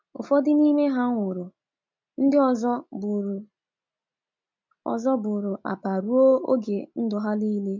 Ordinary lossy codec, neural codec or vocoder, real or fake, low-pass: MP3, 64 kbps; none; real; 7.2 kHz